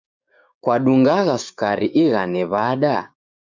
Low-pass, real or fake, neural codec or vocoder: 7.2 kHz; fake; codec, 16 kHz, 6 kbps, DAC